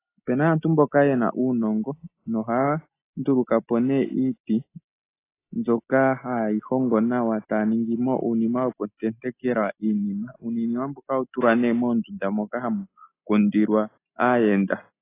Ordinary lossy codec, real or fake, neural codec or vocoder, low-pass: AAC, 24 kbps; real; none; 3.6 kHz